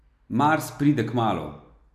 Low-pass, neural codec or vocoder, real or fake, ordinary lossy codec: 14.4 kHz; none; real; none